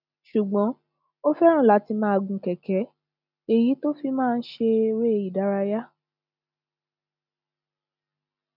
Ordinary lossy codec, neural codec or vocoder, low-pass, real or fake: none; none; 5.4 kHz; real